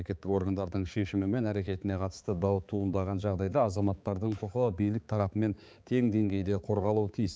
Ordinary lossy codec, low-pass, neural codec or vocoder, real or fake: none; none; codec, 16 kHz, 4 kbps, X-Codec, HuBERT features, trained on balanced general audio; fake